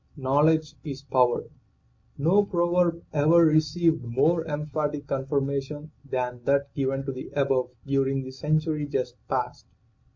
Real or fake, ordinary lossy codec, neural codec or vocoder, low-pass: real; MP3, 48 kbps; none; 7.2 kHz